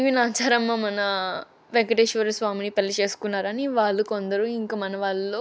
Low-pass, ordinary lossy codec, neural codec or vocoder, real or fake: none; none; none; real